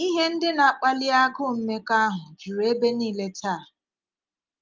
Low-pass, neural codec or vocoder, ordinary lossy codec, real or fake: 7.2 kHz; none; Opus, 24 kbps; real